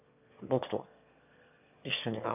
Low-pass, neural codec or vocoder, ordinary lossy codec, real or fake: 3.6 kHz; autoencoder, 22.05 kHz, a latent of 192 numbers a frame, VITS, trained on one speaker; none; fake